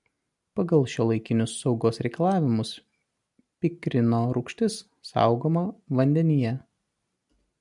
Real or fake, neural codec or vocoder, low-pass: real; none; 10.8 kHz